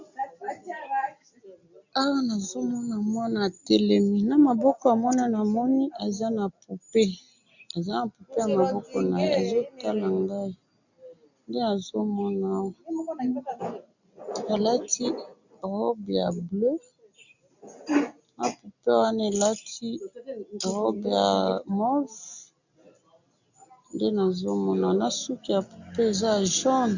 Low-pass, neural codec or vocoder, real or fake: 7.2 kHz; none; real